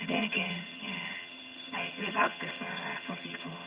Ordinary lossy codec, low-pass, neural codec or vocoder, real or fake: Opus, 64 kbps; 3.6 kHz; vocoder, 22.05 kHz, 80 mel bands, HiFi-GAN; fake